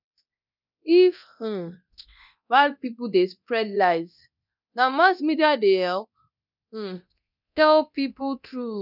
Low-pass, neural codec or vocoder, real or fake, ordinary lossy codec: 5.4 kHz; codec, 24 kHz, 0.9 kbps, DualCodec; fake; none